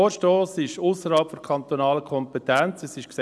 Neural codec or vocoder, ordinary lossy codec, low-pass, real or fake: none; none; none; real